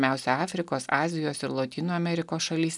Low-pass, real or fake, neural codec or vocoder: 10.8 kHz; real; none